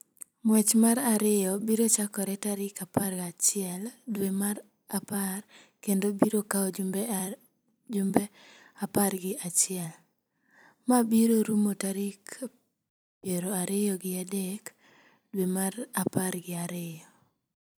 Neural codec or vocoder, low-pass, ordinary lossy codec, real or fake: vocoder, 44.1 kHz, 128 mel bands every 256 samples, BigVGAN v2; none; none; fake